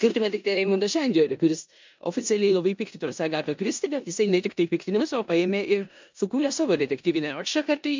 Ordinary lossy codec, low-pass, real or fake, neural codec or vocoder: MP3, 64 kbps; 7.2 kHz; fake; codec, 16 kHz in and 24 kHz out, 0.9 kbps, LongCat-Audio-Codec, four codebook decoder